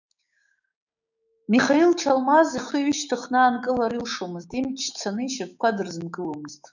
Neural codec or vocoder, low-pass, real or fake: codec, 16 kHz, 6 kbps, DAC; 7.2 kHz; fake